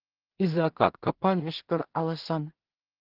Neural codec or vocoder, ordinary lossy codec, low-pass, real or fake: codec, 16 kHz in and 24 kHz out, 0.4 kbps, LongCat-Audio-Codec, two codebook decoder; Opus, 16 kbps; 5.4 kHz; fake